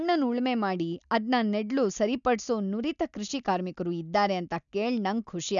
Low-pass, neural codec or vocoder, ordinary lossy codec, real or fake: 7.2 kHz; none; none; real